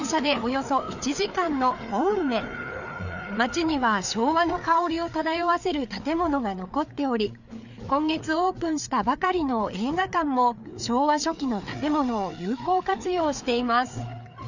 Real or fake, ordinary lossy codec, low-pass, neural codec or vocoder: fake; none; 7.2 kHz; codec, 16 kHz, 4 kbps, FreqCodec, larger model